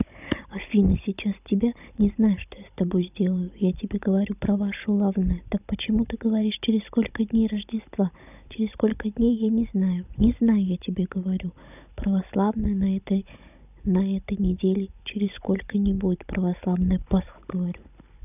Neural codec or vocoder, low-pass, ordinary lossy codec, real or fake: codec, 16 kHz, 16 kbps, FunCodec, trained on Chinese and English, 50 frames a second; 3.6 kHz; none; fake